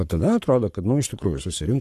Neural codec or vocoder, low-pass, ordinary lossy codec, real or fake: codec, 44.1 kHz, 7.8 kbps, Pupu-Codec; 14.4 kHz; AAC, 64 kbps; fake